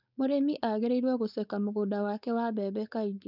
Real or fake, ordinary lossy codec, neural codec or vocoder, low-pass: fake; none; codec, 16 kHz, 4.8 kbps, FACodec; 5.4 kHz